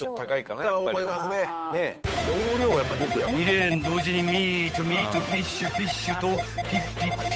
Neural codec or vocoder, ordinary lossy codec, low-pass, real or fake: codec, 16 kHz, 8 kbps, FunCodec, trained on Chinese and English, 25 frames a second; none; none; fake